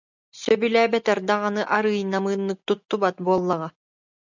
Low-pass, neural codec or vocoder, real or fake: 7.2 kHz; none; real